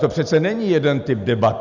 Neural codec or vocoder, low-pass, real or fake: none; 7.2 kHz; real